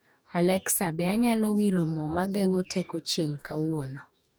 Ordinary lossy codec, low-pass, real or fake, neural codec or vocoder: none; none; fake; codec, 44.1 kHz, 2.6 kbps, DAC